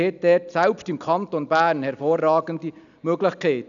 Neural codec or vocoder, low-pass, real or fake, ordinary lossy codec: none; 7.2 kHz; real; none